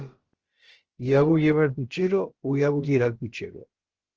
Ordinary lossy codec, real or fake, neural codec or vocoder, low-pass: Opus, 16 kbps; fake; codec, 16 kHz, about 1 kbps, DyCAST, with the encoder's durations; 7.2 kHz